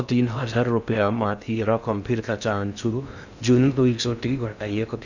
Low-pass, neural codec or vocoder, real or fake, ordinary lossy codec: 7.2 kHz; codec, 16 kHz in and 24 kHz out, 0.6 kbps, FocalCodec, streaming, 2048 codes; fake; none